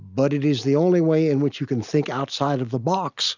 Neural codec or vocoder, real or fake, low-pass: none; real; 7.2 kHz